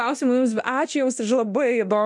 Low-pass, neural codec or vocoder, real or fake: 10.8 kHz; codec, 24 kHz, 0.9 kbps, DualCodec; fake